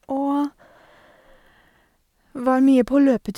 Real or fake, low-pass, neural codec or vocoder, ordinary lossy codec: real; 19.8 kHz; none; none